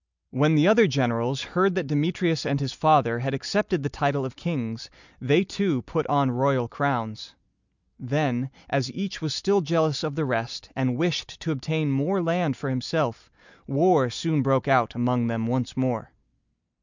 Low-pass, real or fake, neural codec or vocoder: 7.2 kHz; real; none